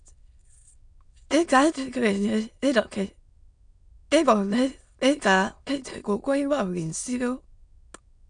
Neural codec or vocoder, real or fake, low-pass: autoencoder, 22.05 kHz, a latent of 192 numbers a frame, VITS, trained on many speakers; fake; 9.9 kHz